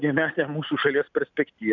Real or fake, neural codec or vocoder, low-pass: real; none; 7.2 kHz